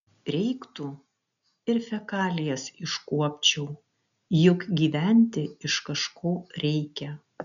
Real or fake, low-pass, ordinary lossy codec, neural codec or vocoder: real; 7.2 kHz; MP3, 96 kbps; none